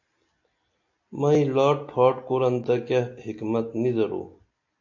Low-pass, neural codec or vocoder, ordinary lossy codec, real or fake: 7.2 kHz; none; AAC, 48 kbps; real